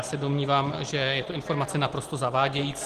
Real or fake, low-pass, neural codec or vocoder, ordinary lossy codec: real; 14.4 kHz; none; Opus, 16 kbps